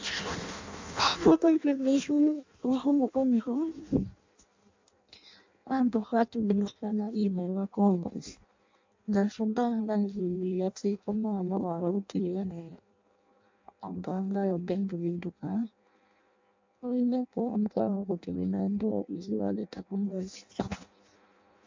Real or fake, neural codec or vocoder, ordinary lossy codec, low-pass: fake; codec, 16 kHz in and 24 kHz out, 0.6 kbps, FireRedTTS-2 codec; MP3, 64 kbps; 7.2 kHz